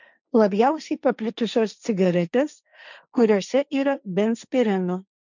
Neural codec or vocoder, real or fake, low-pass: codec, 16 kHz, 1.1 kbps, Voila-Tokenizer; fake; 7.2 kHz